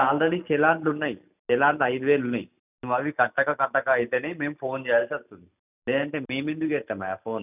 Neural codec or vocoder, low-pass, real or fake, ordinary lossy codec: none; 3.6 kHz; real; none